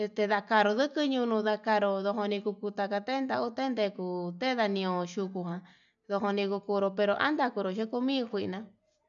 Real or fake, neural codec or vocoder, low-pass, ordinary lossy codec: real; none; 7.2 kHz; none